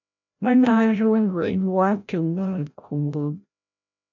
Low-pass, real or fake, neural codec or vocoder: 7.2 kHz; fake; codec, 16 kHz, 0.5 kbps, FreqCodec, larger model